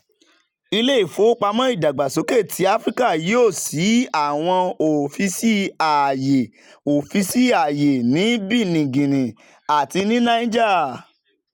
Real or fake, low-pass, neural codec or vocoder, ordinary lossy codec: real; 19.8 kHz; none; none